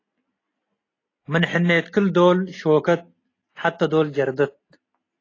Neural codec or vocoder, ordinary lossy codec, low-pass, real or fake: none; AAC, 32 kbps; 7.2 kHz; real